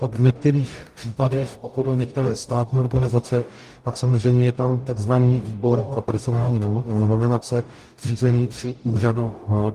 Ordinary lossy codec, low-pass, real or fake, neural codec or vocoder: Opus, 32 kbps; 14.4 kHz; fake; codec, 44.1 kHz, 0.9 kbps, DAC